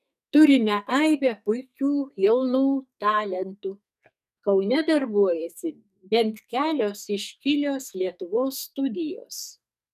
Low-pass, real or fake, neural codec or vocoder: 14.4 kHz; fake; codec, 44.1 kHz, 2.6 kbps, SNAC